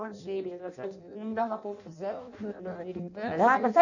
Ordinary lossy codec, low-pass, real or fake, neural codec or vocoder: none; 7.2 kHz; fake; codec, 16 kHz in and 24 kHz out, 0.6 kbps, FireRedTTS-2 codec